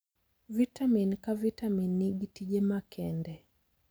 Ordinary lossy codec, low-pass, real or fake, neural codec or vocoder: none; none; real; none